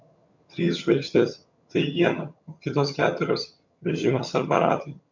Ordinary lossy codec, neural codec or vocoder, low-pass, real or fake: MP3, 64 kbps; vocoder, 22.05 kHz, 80 mel bands, HiFi-GAN; 7.2 kHz; fake